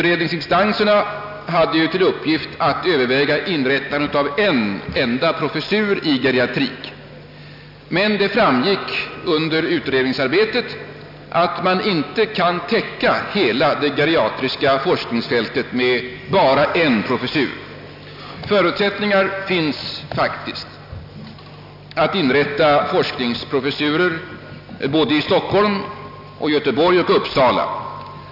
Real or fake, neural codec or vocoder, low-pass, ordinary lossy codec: real; none; 5.4 kHz; none